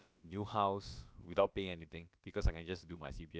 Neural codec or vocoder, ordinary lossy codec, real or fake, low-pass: codec, 16 kHz, about 1 kbps, DyCAST, with the encoder's durations; none; fake; none